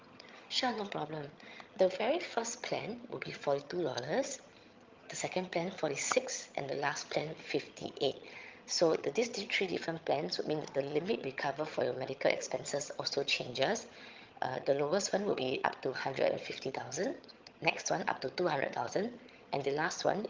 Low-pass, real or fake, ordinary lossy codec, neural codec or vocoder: 7.2 kHz; fake; Opus, 32 kbps; vocoder, 22.05 kHz, 80 mel bands, HiFi-GAN